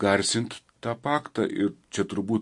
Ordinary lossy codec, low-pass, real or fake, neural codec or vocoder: MP3, 48 kbps; 10.8 kHz; real; none